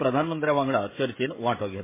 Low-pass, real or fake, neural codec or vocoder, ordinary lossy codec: 3.6 kHz; fake; codec, 16 kHz, 16 kbps, FreqCodec, smaller model; MP3, 16 kbps